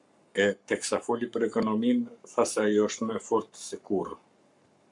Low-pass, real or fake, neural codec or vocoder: 10.8 kHz; fake; codec, 44.1 kHz, 7.8 kbps, Pupu-Codec